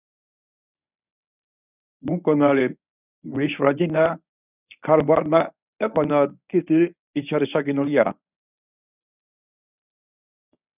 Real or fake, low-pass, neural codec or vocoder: fake; 3.6 kHz; codec, 24 kHz, 0.9 kbps, WavTokenizer, medium speech release version 1